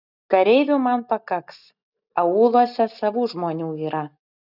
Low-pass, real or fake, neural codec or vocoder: 5.4 kHz; real; none